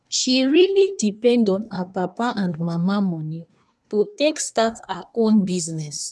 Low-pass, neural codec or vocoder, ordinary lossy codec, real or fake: none; codec, 24 kHz, 1 kbps, SNAC; none; fake